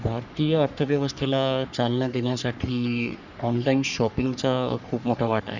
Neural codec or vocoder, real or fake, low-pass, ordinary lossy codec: codec, 44.1 kHz, 3.4 kbps, Pupu-Codec; fake; 7.2 kHz; none